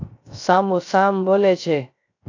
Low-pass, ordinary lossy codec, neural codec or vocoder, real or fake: 7.2 kHz; AAC, 48 kbps; codec, 16 kHz, 0.3 kbps, FocalCodec; fake